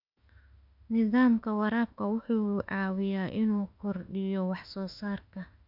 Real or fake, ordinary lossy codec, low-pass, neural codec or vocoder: fake; none; 5.4 kHz; autoencoder, 48 kHz, 32 numbers a frame, DAC-VAE, trained on Japanese speech